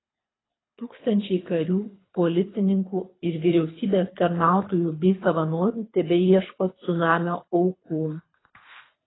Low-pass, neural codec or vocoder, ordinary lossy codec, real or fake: 7.2 kHz; codec, 24 kHz, 3 kbps, HILCodec; AAC, 16 kbps; fake